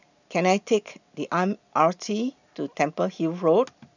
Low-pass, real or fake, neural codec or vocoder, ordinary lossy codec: 7.2 kHz; real; none; none